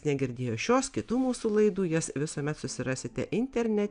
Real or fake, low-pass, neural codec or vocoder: real; 9.9 kHz; none